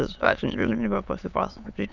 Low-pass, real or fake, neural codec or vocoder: 7.2 kHz; fake; autoencoder, 22.05 kHz, a latent of 192 numbers a frame, VITS, trained on many speakers